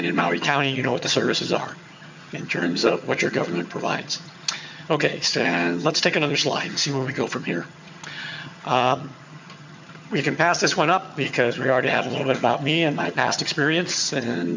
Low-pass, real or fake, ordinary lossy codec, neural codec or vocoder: 7.2 kHz; fake; MP3, 64 kbps; vocoder, 22.05 kHz, 80 mel bands, HiFi-GAN